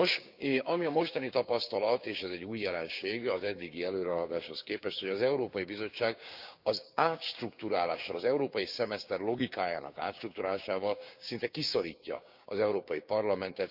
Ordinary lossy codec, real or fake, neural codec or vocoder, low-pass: none; fake; codec, 16 kHz, 6 kbps, DAC; 5.4 kHz